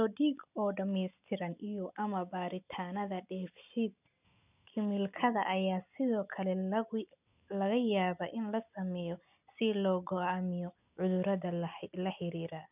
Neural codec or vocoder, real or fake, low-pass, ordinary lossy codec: none; real; 3.6 kHz; none